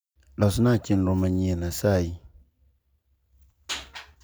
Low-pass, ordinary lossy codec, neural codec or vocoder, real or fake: none; none; none; real